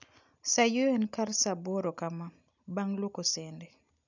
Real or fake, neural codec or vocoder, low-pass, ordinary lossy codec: real; none; 7.2 kHz; none